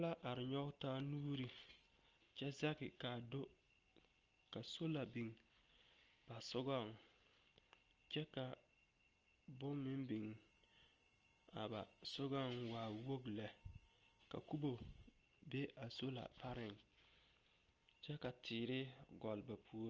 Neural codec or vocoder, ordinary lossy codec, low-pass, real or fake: none; Opus, 24 kbps; 7.2 kHz; real